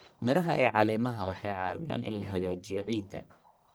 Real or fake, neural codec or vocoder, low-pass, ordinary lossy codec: fake; codec, 44.1 kHz, 1.7 kbps, Pupu-Codec; none; none